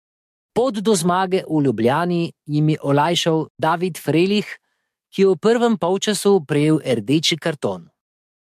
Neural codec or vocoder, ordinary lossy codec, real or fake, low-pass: codec, 44.1 kHz, 7.8 kbps, DAC; MP3, 64 kbps; fake; 14.4 kHz